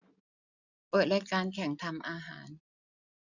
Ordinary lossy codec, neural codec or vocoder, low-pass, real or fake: none; none; 7.2 kHz; real